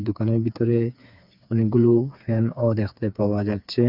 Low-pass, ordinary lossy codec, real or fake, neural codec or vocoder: 5.4 kHz; none; fake; codec, 16 kHz, 4 kbps, FreqCodec, smaller model